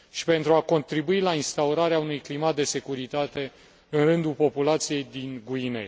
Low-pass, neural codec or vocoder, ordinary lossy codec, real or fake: none; none; none; real